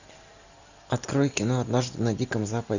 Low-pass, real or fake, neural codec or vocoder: 7.2 kHz; real; none